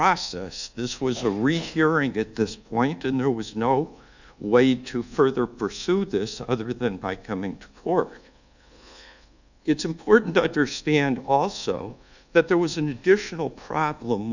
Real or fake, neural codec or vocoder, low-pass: fake; codec, 24 kHz, 1.2 kbps, DualCodec; 7.2 kHz